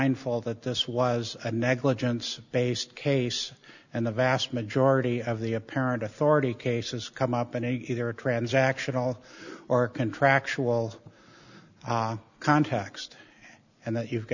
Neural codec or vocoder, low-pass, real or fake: none; 7.2 kHz; real